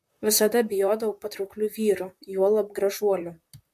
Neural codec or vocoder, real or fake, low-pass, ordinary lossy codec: vocoder, 44.1 kHz, 128 mel bands, Pupu-Vocoder; fake; 14.4 kHz; MP3, 64 kbps